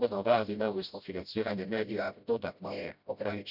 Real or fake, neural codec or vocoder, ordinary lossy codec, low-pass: fake; codec, 16 kHz, 0.5 kbps, FreqCodec, smaller model; none; 5.4 kHz